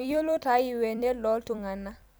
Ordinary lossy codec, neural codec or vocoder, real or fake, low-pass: none; vocoder, 44.1 kHz, 128 mel bands every 256 samples, BigVGAN v2; fake; none